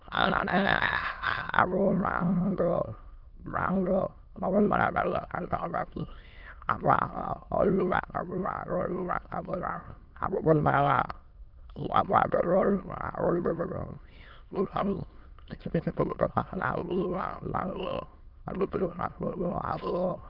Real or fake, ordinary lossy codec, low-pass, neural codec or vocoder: fake; Opus, 24 kbps; 5.4 kHz; autoencoder, 22.05 kHz, a latent of 192 numbers a frame, VITS, trained on many speakers